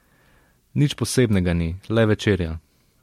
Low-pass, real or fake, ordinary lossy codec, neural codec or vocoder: 19.8 kHz; real; MP3, 64 kbps; none